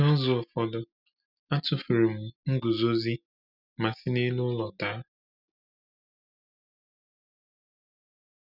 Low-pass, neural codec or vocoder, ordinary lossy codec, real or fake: 5.4 kHz; none; none; real